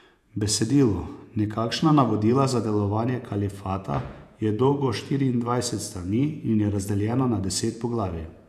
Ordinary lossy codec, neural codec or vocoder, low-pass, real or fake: none; vocoder, 48 kHz, 128 mel bands, Vocos; 14.4 kHz; fake